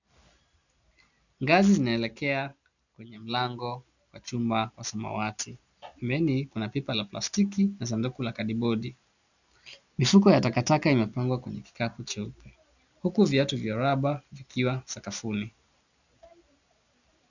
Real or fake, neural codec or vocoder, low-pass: real; none; 7.2 kHz